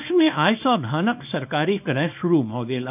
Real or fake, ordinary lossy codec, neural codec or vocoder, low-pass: fake; none; codec, 24 kHz, 0.9 kbps, WavTokenizer, small release; 3.6 kHz